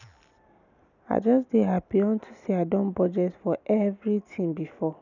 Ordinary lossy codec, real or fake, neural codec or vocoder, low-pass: none; real; none; 7.2 kHz